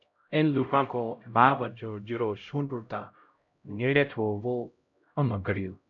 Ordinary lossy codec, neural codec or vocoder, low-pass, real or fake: MP3, 96 kbps; codec, 16 kHz, 0.5 kbps, X-Codec, HuBERT features, trained on LibriSpeech; 7.2 kHz; fake